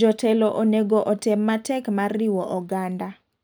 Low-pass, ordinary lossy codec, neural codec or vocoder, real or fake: none; none; none; real